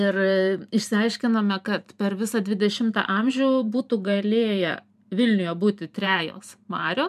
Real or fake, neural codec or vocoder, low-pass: fake; vocoder, 44.1 kHz, 128 mel bands every 512 samples, BigVGAN v2; 14.4 kHz